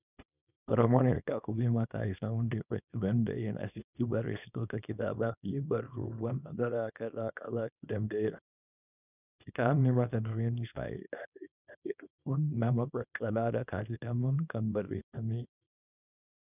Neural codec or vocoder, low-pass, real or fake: codec, 24 kHz, 0.9 kbps, WavTokenizer, small release; 3.6 kHz; fake